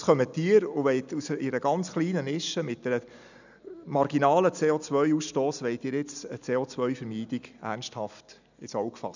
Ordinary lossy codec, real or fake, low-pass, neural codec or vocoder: none; real; 7.2 kHz; none